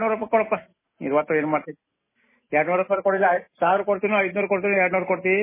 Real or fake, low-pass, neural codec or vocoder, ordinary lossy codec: real; 3.6 kHz; none; MP3, 16 kbps